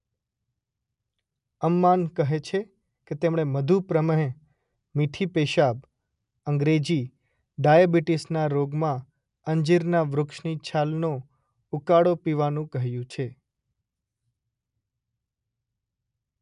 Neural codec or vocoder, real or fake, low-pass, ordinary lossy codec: none; real; 10.8 kHz; MP3, 96 kbps